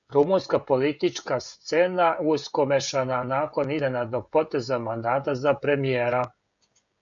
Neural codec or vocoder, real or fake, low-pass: codec, 16 kHz, 16 kbps, FreqCodec, smaller model; fake; 7.2 kHz